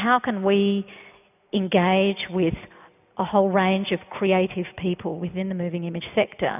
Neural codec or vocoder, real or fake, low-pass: none; real; 3.6 kHz